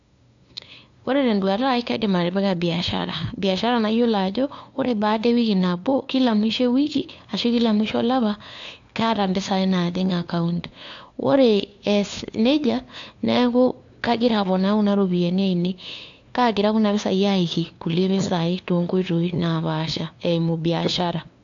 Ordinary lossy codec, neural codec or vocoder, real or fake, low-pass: AAC, 64 kbps; codec, 16 kHz, 2 kbps, FunCodec, trained on LibriTTS, 25 frames a second; fake; 7.2 kHz